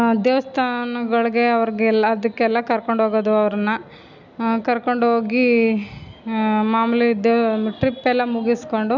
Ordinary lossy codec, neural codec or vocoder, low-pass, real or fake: none; none; 7.2 kHz; real